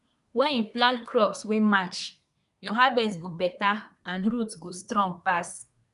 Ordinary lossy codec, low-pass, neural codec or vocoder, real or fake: none; 10.8 kHz; codec, 24 kHz, 1 kbps, SNAC; fake